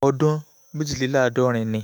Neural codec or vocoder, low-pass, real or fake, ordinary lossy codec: autoencoder, 48 kHz, 128 numbers a frame, DAC-VAE, trained on Japanese speech; none; fake; none